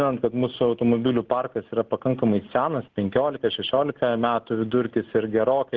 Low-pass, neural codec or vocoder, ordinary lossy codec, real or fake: 7.2 kHz; none; Opus, 16 kbps; real